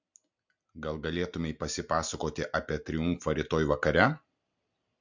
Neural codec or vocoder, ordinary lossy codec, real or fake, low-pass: none; MP3, 64 kbps; real; 7.2 kHz